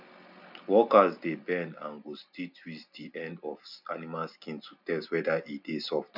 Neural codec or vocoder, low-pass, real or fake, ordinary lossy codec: none; 5.4 kHz; real; none